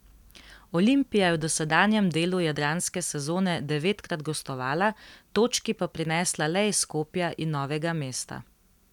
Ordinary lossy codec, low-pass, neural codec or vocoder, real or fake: none; 19.8 kHz; vocoder, 44.1 kHz, 128 mel bands every 256 samples, BigVGAN v2; fake